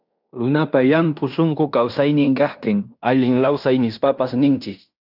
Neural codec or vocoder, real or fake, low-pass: codec, 16 kHz in and 24 kHz out, 0.9 kbps, LongCat-Audio-Codec, fine tuned four codebook decoder; fake; 5.4 kHz